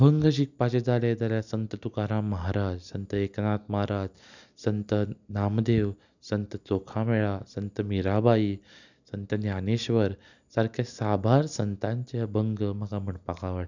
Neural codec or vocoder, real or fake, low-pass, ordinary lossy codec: none; real; 7.2 kHz; none